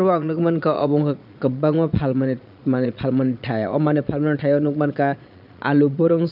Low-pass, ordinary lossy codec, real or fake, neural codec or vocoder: 5.4 kHz; none; fake; vocoder, 22.05 kHz, 80 mel bands, Vocos